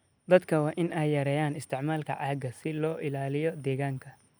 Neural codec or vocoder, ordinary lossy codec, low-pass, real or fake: none; none; none; real